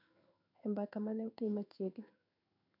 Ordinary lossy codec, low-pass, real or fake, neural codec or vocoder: none; 5.4 kHz; fake; codec, 16 kHz in and 24 kHz out, 1 kbps, XY-Tokenizer